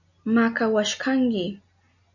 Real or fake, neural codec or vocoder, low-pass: real; none; 7.2 kHz